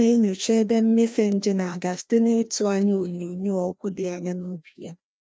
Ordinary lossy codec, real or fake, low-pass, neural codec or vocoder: none; fake; none; codec, 16 kHz, 1 kbps, FreqCodec, larger model